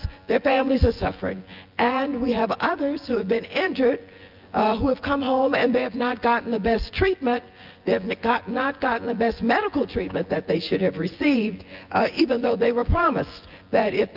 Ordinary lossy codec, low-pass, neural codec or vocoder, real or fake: Opus, 32 kbps; 5.4 kHz; vocoder, 24 kHz, 100 mel bands, Vocos; fake